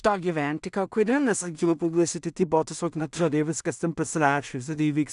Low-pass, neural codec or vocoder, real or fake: 10.8 kHz; codec, 16 kHz in and 24 kHz out, 0.4 kbps, LongCat-Audio-Codec, two codebook decoder; fake